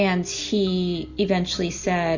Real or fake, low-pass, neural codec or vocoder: real; 7.2 kHz; none